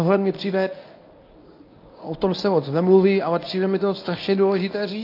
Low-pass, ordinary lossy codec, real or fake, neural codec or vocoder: 5.4 kHz; AAC, 32 kbps; fake; codec, 24 kHz, 0.9 kbps, WavTokenizer, medium speech release version 1